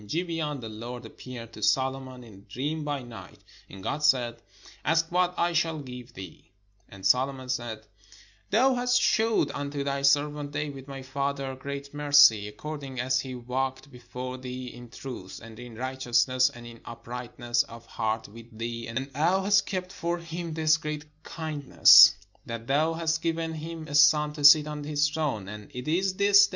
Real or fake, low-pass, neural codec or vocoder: real; 7.2 kHz; none